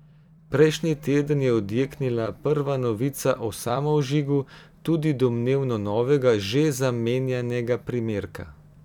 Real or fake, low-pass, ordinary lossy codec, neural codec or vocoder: fake; 19.8 kHz; none; vocoder, 44.1 kHz, 128 mel bands every 256 samples, BigVGAN v2